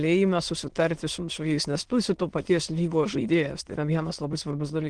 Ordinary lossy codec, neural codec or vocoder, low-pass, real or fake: Opus, 16 kbps; autoencoder, 22.05 kHz, a latent of 192 numbers a frame, VITS, trained on many speakers; 9.9 kHz; fake